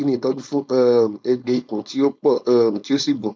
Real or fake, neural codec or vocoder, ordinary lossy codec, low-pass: fake; codec, 16 kHz, 4.8 kbps, FACodec; none; none